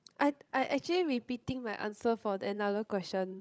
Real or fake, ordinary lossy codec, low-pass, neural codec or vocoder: fake; none; none; codec, 16 kHz, 16 kbps, FreqCodec, larger model